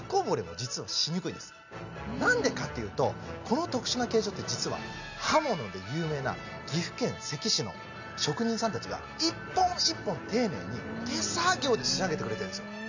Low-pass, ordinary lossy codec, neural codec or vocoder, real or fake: 7.2 kHz; none; none; real